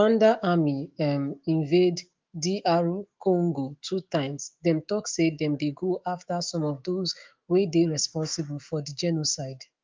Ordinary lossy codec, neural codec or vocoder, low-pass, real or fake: Opus, 32 kbps; autoencoder, 48 kHz, 128 numbers a frame, DAC-VAE, trained on Japanese speech; 7.2 kHz; fake